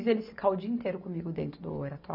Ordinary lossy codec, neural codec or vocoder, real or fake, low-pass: none; none; real; 5.4 kHz